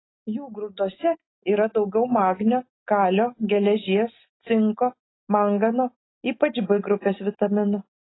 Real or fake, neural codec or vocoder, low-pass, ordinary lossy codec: real; none; 7.2 kHz; AAC, 16 kbps